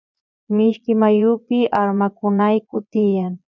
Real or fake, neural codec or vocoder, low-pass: fake; vocoder, 22.05 kHz, 80 mel bands, Vocos; 7.2 kHz